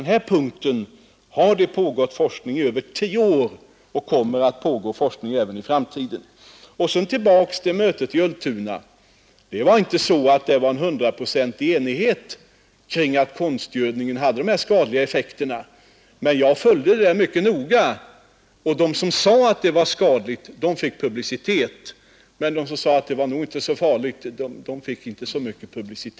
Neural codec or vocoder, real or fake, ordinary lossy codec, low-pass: none; real; none; none